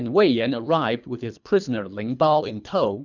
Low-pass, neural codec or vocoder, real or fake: 7.2 kHz; codec, 24 kHz, 3 kbps, HILCodec; fake